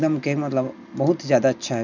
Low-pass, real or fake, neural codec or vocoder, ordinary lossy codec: 7.2 kHz; real; none; none